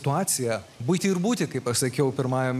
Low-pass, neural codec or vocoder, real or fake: 14.4 kHz; none; real